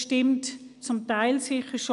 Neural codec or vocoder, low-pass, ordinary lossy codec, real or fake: none; 10.8 kHz; MP3, 96 kbps; real